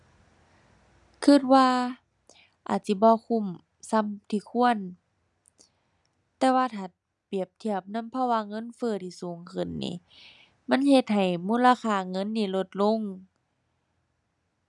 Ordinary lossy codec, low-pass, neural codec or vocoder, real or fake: none; 10.8 kHz; none; real